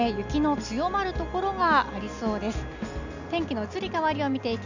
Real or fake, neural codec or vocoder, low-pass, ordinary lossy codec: real; none; 7.2 kHz; none